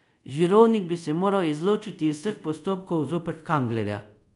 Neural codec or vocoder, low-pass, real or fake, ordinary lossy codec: codec, 24 kHz, 0.5 kbps, DualCodec; 10.8 kHz; fake; none